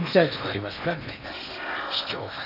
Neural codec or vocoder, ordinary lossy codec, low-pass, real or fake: codec, 16 kHz in and 24 kHz out, 0.8 kbps, FocalCodec, streaming, 65536 codes; none; 5.4 kHz; fake